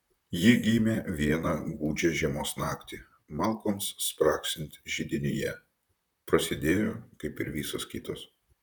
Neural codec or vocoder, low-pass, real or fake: vocoder, 44.1 kHz, 128 mel bands, Pupu-Vocoder; 19.8 kHz; fake